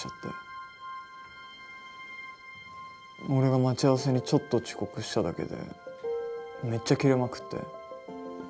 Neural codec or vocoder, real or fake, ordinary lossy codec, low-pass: none; real; none; none